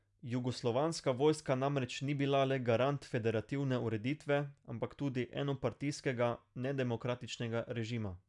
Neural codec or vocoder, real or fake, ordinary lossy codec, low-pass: none; real; none; 10.8 kHz